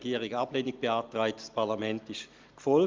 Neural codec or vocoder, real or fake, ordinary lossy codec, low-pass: none; real; Opus, 32 kbps; 7.2 kHz